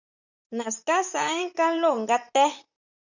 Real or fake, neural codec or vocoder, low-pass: fake; vocoder, 44.1 kHz, 128 mel bands, Pupu-Vocoder; 7.2 kHz